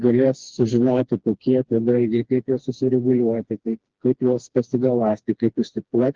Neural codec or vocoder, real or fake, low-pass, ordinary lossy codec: codec, 16 kHz, 2 kbps, FreqCodec, smaller model; fake; 7.2 kHz; Opus, 16 kbps